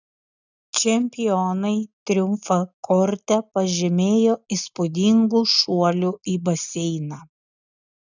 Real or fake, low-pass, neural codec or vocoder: real; 7.2 kHz; none